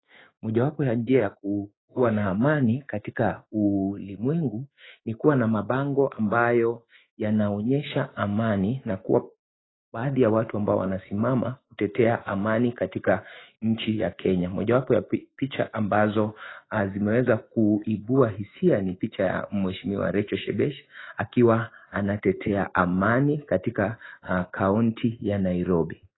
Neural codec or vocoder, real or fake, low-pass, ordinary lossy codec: autoencoder, 48 kHz, 128 numbers a frame, DAC-VAE, trained on Japanese speech; fake; 7.2 kHz; AAC, 16 kbps